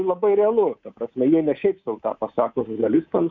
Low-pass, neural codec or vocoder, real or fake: 7.2 kHz; codec, 24 kHz, 3.1 kbps, DualCodec; fake